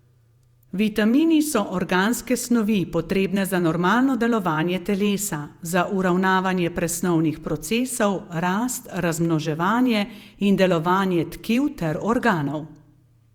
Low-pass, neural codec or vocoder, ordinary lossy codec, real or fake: 19.8 kHz; vocoder, 48 kHz, 128 mel bands, Vocos; Opus, 64 kbps; fake